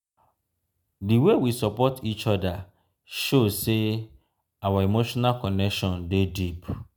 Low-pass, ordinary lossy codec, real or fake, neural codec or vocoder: none; none; real; none